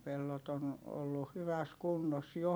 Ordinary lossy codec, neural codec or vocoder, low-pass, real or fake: none; vocoder, 44.1 kHz, 128 mel bands every 256 samples, BigVGAN v2; none; fake